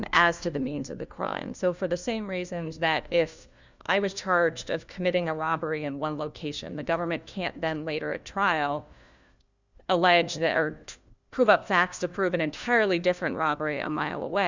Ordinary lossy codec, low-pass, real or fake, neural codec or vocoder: Opus, 64 kbps; 7.2 kHz; fake; codec, 16 kHz, 1 kbps, FunCodec, trained on LibriTTS, 50 frames a second